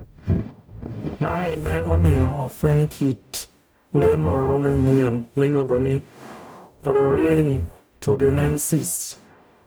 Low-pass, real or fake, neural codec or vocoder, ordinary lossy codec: none; fake; codec, 44.1 kHz, 0.9 kbps, DAC; none